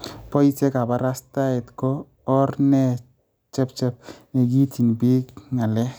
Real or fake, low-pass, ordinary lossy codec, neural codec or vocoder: real; none; none; none